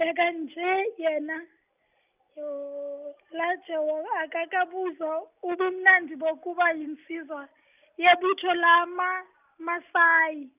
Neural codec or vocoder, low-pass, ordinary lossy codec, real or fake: none; 3.6 kHz; none; real